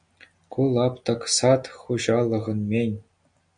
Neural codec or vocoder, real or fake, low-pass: none; real; 9.9 kHz